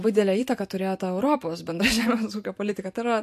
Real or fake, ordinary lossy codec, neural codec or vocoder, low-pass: real; MP3, 64 kbps; none; 14.4 kHz